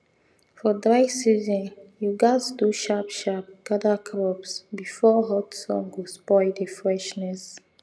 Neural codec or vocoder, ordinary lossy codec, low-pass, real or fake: none; none; none; real